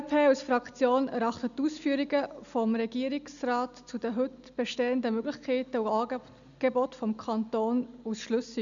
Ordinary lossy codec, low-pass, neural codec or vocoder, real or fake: none; 7.2 kHz; none; real